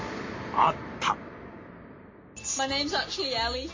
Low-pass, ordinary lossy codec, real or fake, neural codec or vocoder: 7.2 kHz; MP3, 32 kbps; real; none